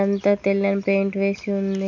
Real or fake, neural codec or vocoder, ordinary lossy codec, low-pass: real; none; none; 7.2 kHz